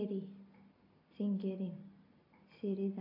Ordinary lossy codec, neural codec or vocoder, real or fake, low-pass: AAC, 32 kbps; none; real; 5.4 kHz